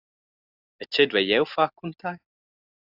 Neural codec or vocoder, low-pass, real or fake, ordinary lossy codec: none; 5.4 kHz; real; AAC, 48 kbps